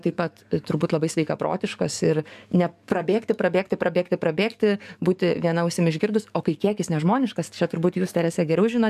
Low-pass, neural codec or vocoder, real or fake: 14.4 kHz; codec, 44.1 kHz, 7.8 kbps, DAC; fake